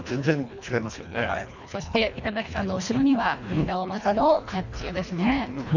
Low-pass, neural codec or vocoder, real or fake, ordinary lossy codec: 7.2 kHz; codec, 24 kHz, 1.5 kbps, HILCodec; fake; none